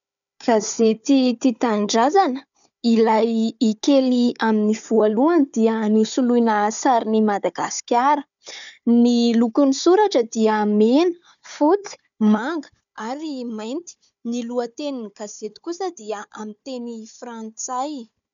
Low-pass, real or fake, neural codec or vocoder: 7.2 kHz; fake; codec, 16 kHz, 4 kbps, FunCodec, trained on Chinese and English, 50 frames a second